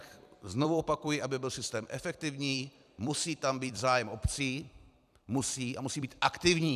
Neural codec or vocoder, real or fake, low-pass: vocoder, 44.1 kHz, 128 mel bands every 256 samples, BigVGAN v2; fake; 14.4 kHz